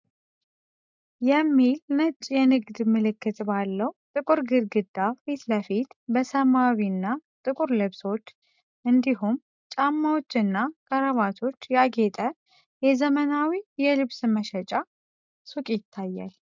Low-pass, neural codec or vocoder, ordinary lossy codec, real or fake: 7.2 kHz; none; MP3, 64 kbps; real